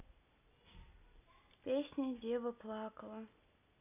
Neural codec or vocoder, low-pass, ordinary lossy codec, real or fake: none; 3.6 kHz; none; real